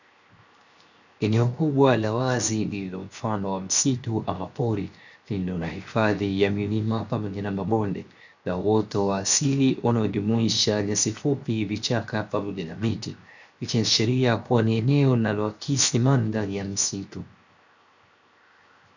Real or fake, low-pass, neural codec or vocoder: fake; 7.2 kHz; codec, 16 kHz, 0.7 kbps, FocalCodec